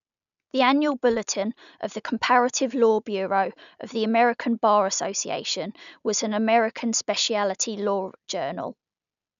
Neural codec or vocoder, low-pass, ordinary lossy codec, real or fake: none; 7.2 kHz; none; real